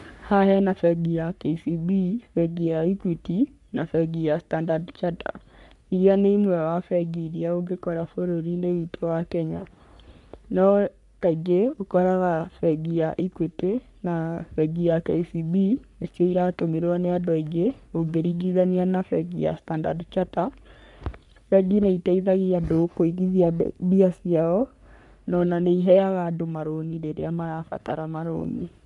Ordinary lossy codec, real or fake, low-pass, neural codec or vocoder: none; fake; 10.8 kHz; codec, 44.1 kHz, 3.4 kbps, Pupu-Codec